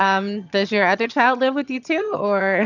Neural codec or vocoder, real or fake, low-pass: vocoder, 22.05 kHz, 80 mel bands, HiFi-GAN; fake; 7.2 kHz